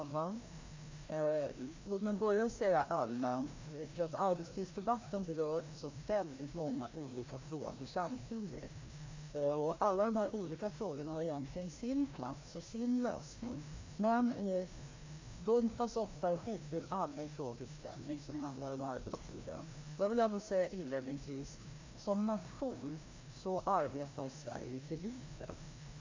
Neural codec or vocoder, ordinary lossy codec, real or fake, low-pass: codec, 16 kHz, 1 kbps, FreqCodec, larger model; MP3, 48 kbps; fake; 7.2 kHz